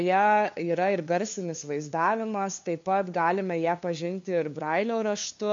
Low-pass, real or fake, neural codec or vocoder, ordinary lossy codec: 7.2 kHz; fake; codec, 16 kHz, 2 kbps, FunCodec, trained on LibriTTS, 25 frames a second; MP3, 48 kbps